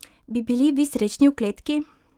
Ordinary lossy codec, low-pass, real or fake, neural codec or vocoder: Opus, 24 kbps; 19.8 kHz; fake; autoencoder, 48 kHz, 128 numbers a frame, DAC-VAE, trained on Japanese speech